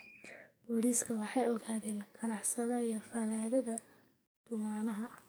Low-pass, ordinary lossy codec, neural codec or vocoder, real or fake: none; none; codec, 44.1 kHz, 2.6 kbps, SNAC; fake